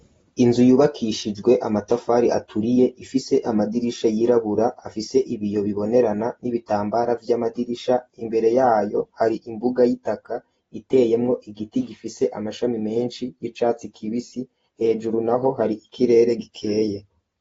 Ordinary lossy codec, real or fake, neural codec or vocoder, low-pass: AAC, 24 kbps; real; none; 14.4 kHz